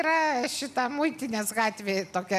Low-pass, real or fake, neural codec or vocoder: 14.4 kHz; real; none